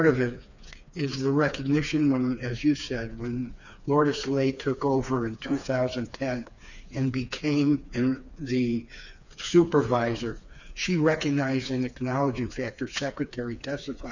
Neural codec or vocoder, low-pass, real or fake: codec, 16 kHz, 4 kbps, FreqCodec, smaller model; 7.2 kHz; fake